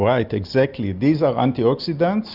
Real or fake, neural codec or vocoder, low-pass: real; none; 5.4 kHz